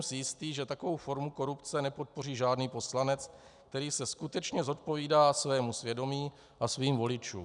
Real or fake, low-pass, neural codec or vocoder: real; 10.8 kHz; none